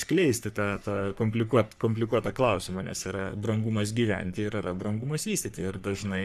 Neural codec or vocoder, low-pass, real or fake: codec, 44.1 kHz, 3.4 kbps, Pupu-Codec; 14.4 kHz; fake